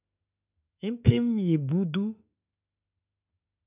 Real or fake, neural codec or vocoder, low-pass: fake; autoencoder, 48 kHz, 32 numbers a frame, DAC-VAE, trained on Japanese speech; 3.6 kHz